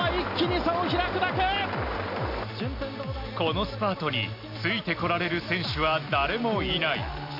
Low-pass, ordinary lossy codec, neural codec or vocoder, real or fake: 5.4 kHz; none; none; real